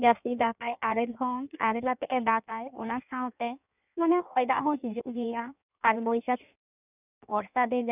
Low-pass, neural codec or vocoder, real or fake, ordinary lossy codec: 3.6 kHz; codec, 16 kHz in and 24 kHz out, 1.1 kbps, FireRedTTS-2 codec; fake; none